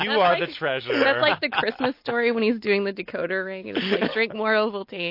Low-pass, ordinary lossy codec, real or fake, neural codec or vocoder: 5.4 kHz; MP3, 32 kbps; real; none